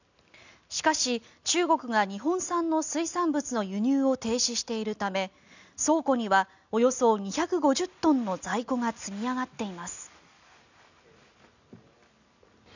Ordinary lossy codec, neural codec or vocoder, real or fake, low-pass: none; none; real; 7.2 kHz